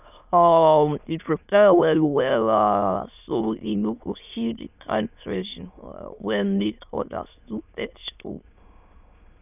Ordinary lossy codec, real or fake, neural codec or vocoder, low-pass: none; fake; autoencoder, 22.05 kHz, a latent of 192 numbers a frame, VITS, trained on many speakers; 3.6 kHz